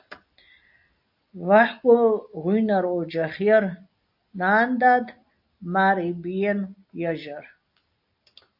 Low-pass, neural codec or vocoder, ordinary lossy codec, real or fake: 5.4 kHz; none; AAC, 48 kbps; real